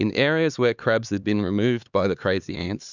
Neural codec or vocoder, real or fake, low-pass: codec, 16 kHz, 4 kbps, X-Codec, HuBERT features, trained on LibriSpeech; fake; 7.2 kHz